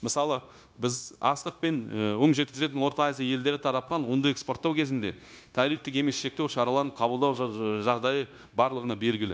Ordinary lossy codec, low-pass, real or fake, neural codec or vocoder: none; none; fake; codec, 16 kHz, 0.9 kbps, LongCat-Audio-Codec